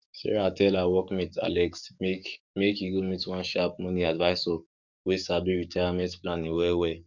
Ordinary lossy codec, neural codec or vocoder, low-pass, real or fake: none; codec, 44.1 kHz, 7.8 kbps, DAC; 7.2 kHz; fake